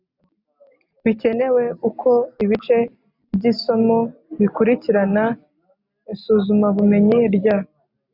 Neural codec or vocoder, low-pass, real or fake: none; 5.4 kHz; real